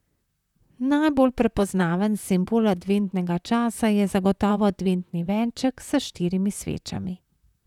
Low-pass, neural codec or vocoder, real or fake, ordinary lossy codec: 19.8 kHz; vocoder, 44.1 kHz, 128 mel bands, Pupu-Vocoder; fake; none